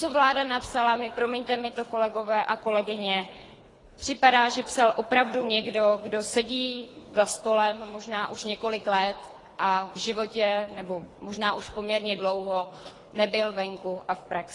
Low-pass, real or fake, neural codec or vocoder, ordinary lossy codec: 10.8 kHz; fake; codec, 24 kHz, 3 kbps, HILCodec; AAC, 32 kbps